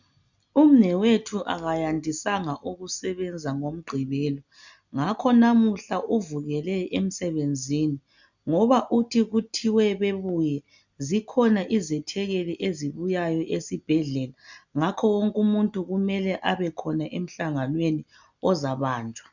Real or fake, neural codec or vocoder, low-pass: real; none; 7.2 kHz